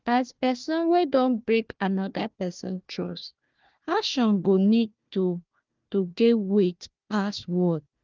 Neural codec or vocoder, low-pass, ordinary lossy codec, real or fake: codec, 16 kHz, 1 kbps, FunCodec, trained on Chinese and English, 50 frames a second; 7.2 kHz; Opus, 24 kbps; fake